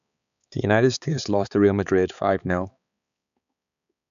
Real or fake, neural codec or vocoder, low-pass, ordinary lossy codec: fake; codec, 16 kHz, 4 kbps, X-Codec, HuBERT features, trained on balanced general audio; 7.2 kHz; none